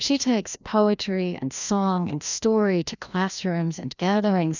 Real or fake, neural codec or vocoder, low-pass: fake; codec, 16 kHz, 1 kbps, FreqCodec, larger model; 7.2 kHz